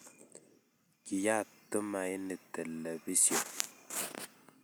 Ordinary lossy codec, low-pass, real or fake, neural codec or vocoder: none; none; real; none